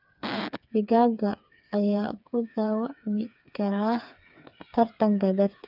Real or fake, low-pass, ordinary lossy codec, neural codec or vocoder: fake; 5.4 kHz; none; codec, 16 kHz, 4 kbps, FreqCodec, smaller model